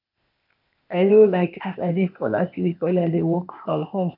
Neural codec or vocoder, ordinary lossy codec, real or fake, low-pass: codec, 16 kHz, 0.8 kbps, ZipCodec; none; fake; 5.4 kHz